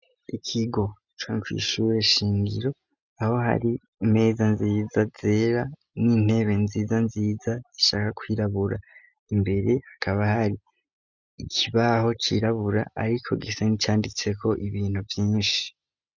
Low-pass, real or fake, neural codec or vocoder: 7.2 kHz; real; none